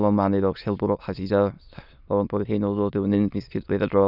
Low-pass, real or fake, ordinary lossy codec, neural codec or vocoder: 5.4 kHz; fake; none; autoencoder, 22.05 kHz, a latent of 192 numbers a frame, VITS, trained on many speakers